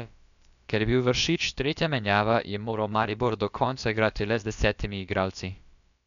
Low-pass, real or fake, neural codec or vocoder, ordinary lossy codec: 7.2 kHz; fake; codec, 16 kHz, about 1 kbps, DyCAST, with the encoder's durations; none